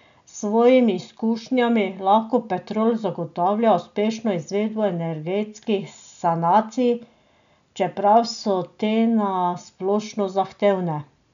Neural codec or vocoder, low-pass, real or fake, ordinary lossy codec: none; 7.2 kHz; real; none